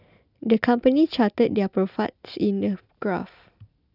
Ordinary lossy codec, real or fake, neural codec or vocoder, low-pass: none; real; none; 5.4 kHz